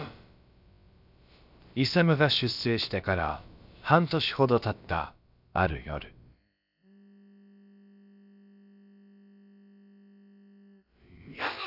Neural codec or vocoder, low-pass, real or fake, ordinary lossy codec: codec, 16 kHz, about 1 kbps, DyCAST, with the encoder's durations; 5.4 kHz; fake; none